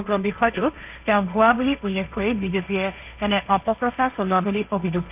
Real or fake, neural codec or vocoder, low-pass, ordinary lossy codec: fake; codec, 16 kHz, 1.1 kbps, Voila-Tokenizer; 3.6 kHz; AAC, 32 kbps